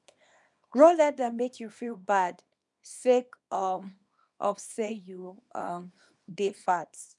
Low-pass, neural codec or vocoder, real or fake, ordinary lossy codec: 10.8 kHz; codec, 24 kHz, 0.9 kbps, WavTokenizer, small release; fake; none